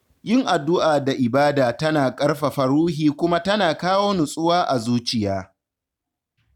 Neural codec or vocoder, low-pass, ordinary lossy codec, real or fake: none; 19.8 kHz; none; real